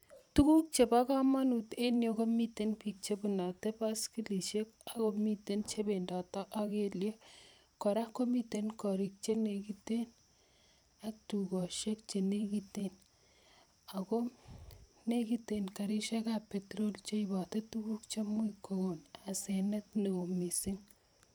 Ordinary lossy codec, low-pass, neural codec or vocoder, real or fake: none; none; vocoder, 44.1 kHz, 128 mel bands every 512 samples, BigVGAN v2; fake